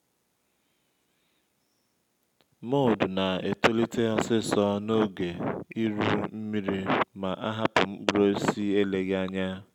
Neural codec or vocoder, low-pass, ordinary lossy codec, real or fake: none; 19.8 kHz; none; real